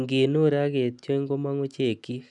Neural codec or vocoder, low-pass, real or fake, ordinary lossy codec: none; 10.8 kHz; real; none